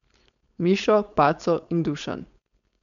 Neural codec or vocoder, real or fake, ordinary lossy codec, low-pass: codec, 16 kHz, 4.8 kbps, FACodec; fake; none; 7.2 kHz